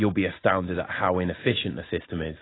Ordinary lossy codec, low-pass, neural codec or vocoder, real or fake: AAC, 16 kbps; 7.2 kHz; none; real